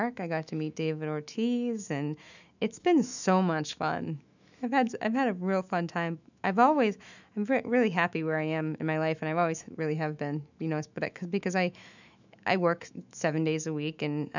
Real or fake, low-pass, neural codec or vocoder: fake; 7.2 kHz; autoencoder, 48 kHz, 128 numbers a frame, DAC-VAE, trained on Japanese speech